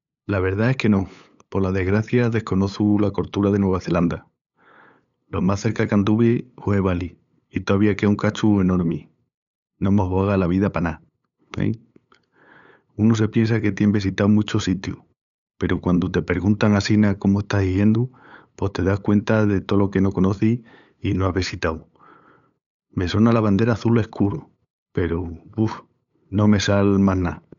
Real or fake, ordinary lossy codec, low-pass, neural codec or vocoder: fake; none; 7.2 kHz; codec, 16 kHz, 8 kbps, FunCodec, trained on LibriTTS, 25 frames a second